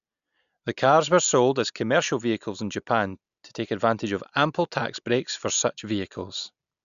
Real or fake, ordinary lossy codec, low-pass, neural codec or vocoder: real; none; 7.2 kHz; none